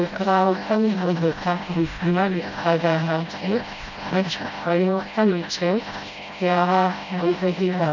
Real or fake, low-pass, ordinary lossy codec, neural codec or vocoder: fake; 7.2 kHz; MP3, 64 kbps; codec, 16 kHz, 0.5 kbps, FreqCodec, smaller model